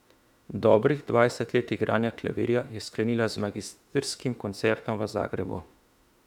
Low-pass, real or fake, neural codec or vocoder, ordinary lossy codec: 19.8 kHz; fake; autoencoder, 48 kHz, 32 numbers a frame, DAC-VAE, trained on Japanese speech; none